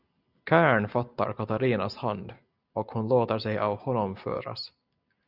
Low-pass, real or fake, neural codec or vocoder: 5.4 kHz; real; none